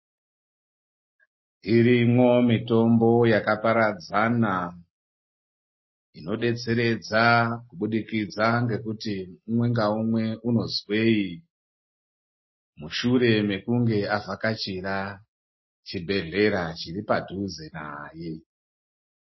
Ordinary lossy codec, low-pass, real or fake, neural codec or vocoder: MP3, 24 kbps; 7.2 kHz; real; none